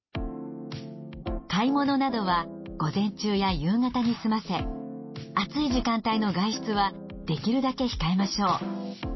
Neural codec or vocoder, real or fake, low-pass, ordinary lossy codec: none; real; 7.2 kHz; MP3, 24 kbps